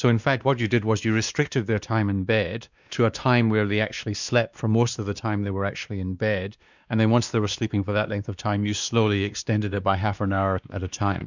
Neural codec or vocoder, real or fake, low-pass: codec, 16 kHz, 2 kbps, X-Codec, WavLM features, trained on Multilingual LibriSpeech; fake; 7.2 kHz